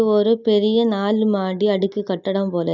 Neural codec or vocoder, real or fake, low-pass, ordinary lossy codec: none; real; 7.2 kHz; none